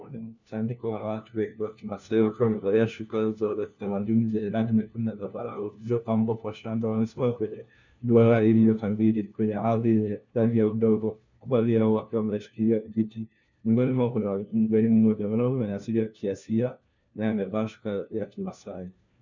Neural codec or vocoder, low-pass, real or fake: codec, 16 kHz, 1 kbps, FunCodec, trained on LibriTTS, 50 frames a second; 7.2 kHz; fake